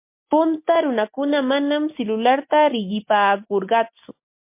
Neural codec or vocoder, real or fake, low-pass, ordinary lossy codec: none; real; 3.6 kHz; MP3, 24 kbps